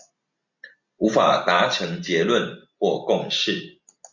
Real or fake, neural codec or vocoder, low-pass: real; none; 7.2 kHz